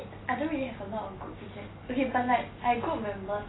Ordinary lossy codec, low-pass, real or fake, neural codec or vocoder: AAC, 16 kbps; 7.2 kHz; real; none